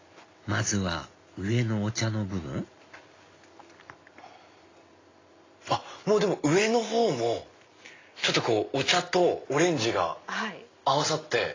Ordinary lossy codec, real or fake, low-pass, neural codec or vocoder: AAC, 32 kbps; real; 7.2 kHz; none